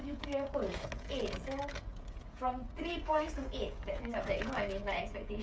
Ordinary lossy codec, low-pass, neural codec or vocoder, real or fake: none; none; codec, 16 kHz, 16 kbps, FreqCodec, smaller model; fake